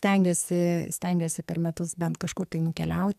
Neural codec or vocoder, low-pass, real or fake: codec, 44.1 kHz, 2.6 kbps, SNAC; 14.4 kHz; fake